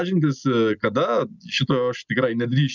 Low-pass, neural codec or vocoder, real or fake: 7.2 kHz; none; real